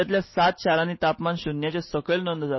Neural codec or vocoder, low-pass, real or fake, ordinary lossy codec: none; 7.2 kHz; real; MP3, 24 kbps